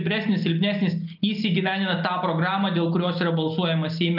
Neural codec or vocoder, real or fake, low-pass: none; real; 5.4 kHz